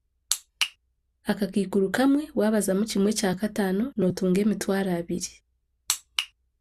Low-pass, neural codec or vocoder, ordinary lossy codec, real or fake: 14.4 kHz; none; Opus, 64 kbps; real